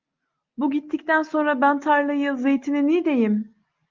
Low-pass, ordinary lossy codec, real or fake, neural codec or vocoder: 7.2 kHz; Opus, 24 kbps; real; none